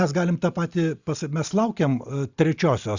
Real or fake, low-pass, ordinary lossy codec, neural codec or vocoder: real; 7.2 kHz; Opus, 64 kbps; none